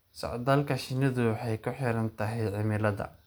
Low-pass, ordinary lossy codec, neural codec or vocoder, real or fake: none; none; none; real